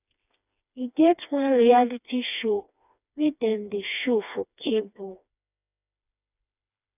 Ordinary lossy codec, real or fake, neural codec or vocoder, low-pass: none; fake; codec, 16 kHz, 2 kbps, FreqCodec, smaller model; 3.6 kHz